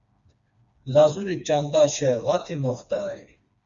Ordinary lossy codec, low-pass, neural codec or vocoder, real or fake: Opus, 64 kbps; 7.2 kHz; codec, 16 kHz, 2 kbps, FreqCodec, smaller model; fake